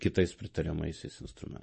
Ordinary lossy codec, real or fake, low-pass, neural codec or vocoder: MP3, 32 kbps; real; 10.8 kHz; none